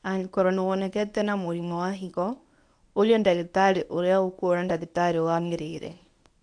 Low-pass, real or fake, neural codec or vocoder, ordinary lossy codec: 9.9 kHz; fake; codec, 24 kHz, 0.9 kbps, WavTokenizer, medium speech release version 1; AAC, 64 kbps